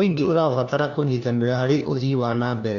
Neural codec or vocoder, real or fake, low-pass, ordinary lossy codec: codec, 16 kHz, 1 kbps, FunCodec, trained on LibriTTS, 50 frames a second; fake; 7.2 kHz; Opus, 64 kbps